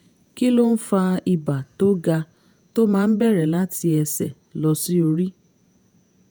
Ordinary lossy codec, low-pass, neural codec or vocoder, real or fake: none; none; vocoder, 48 kHz, 128 mel bands, Vocos; fake